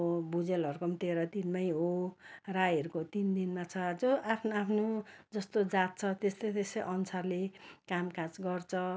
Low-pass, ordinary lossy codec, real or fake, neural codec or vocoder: none; none; real; none